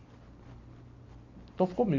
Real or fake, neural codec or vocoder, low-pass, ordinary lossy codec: real; none; 7.2 kHz; MP3, 48 kbps